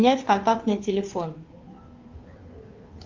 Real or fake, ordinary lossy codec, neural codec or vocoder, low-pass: fake; Opus, 24 kbps; codec, 16 kHz, 2 kbps, FunCodec, trained on Chinese and English, 25 frames a second; 7.2 kHz